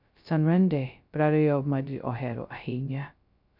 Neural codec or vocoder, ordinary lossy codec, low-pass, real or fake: codec, 16 kHz, 0.2 kbps, FocalCodec; none; 5.4 kHz; fake